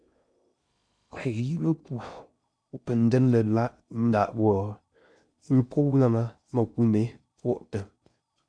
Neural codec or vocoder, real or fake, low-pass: codec, 16 kHz in and 24 kHz out, 0.6 kbps, FocalCodec, streaming, 2048 codes; fake; 9.9 kHz